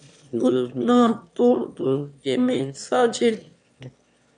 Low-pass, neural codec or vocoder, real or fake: 9.9 kHz; autoencoder, 22.05 kHz, a latent of 192 numbers a frame, VITS, trained on one speaker; fake